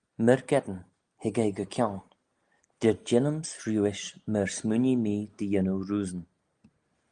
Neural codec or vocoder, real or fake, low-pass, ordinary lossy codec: none; real; 9.9 kHz; Opus, 32 kbps